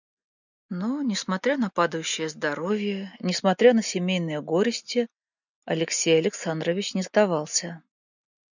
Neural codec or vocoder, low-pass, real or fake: none; 7.2 kHz; real